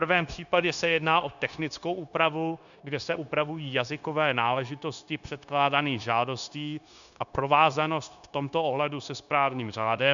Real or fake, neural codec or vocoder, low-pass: fake; codec, 16 kHz, 0.9 kbps, LongCat-Audio-Codec; 7.2 kHz